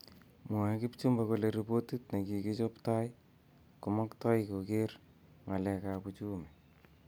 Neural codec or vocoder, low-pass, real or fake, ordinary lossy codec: none; none; real; none